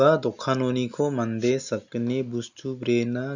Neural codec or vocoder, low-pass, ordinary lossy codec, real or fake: none; 7.2 kHz; none; real